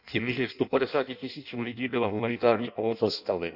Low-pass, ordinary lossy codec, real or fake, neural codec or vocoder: 5.4 kHz; none; fake; codec, 16 kHz in and 24 kHz out, 0.6 kbps, FireRedTTS-2 codec